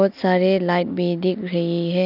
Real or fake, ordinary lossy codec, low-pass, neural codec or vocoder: real; none; 5.4 kHz; none